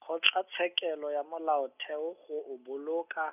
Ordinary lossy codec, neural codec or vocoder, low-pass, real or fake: none; none; 3.6 kHz; real